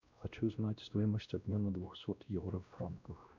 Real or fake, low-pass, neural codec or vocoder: fake; 7.2 kHz; codec, 16 kHz, 1 kbps, X-Codec, WavLM features, trained on Multilingual LibriSpeech